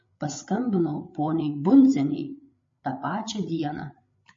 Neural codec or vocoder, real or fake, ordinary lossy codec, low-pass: codec, 16 kHz, 8 kbps, FreqCodec, larger model; fake; MP3, 32 kbps; 7.2 kHz